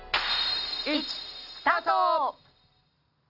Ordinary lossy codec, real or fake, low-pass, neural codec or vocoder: none; real; 5.4 kHz; none